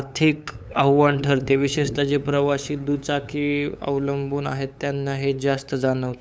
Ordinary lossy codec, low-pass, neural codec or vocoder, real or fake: none; none; codec, 16 kHz, 8 kbps, FunCodec, trained on LibriTTS, 25 frames a second; fake